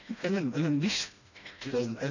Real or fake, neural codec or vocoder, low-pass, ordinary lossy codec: fake; codec, 16 kHz, 1 kbps, FreqCodec, smaller model; 7.2 kHz; none